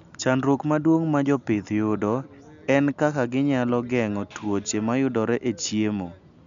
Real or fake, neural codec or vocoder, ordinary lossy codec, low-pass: real; none; none; 7.2 kHz